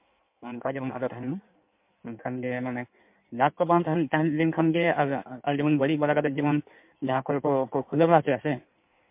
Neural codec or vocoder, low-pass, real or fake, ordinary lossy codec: codec, 16 kHz in and 24 kHz out, 1.1 kbps, FireRedTTS-2 codec; 3.6 kHz; fake; MP3, 32 kbps